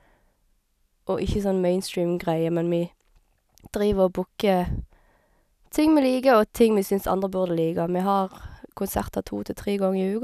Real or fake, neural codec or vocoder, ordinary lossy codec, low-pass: real; none; none; 14.4 kHz